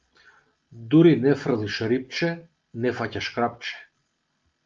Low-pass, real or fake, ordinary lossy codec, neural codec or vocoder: 7.2 kHz; real; Opus, 24 kbps; none